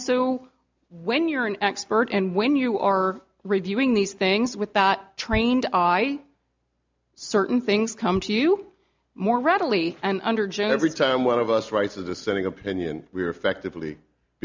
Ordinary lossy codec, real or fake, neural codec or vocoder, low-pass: MP3, 64 kbps; real; none; 7.2 kHz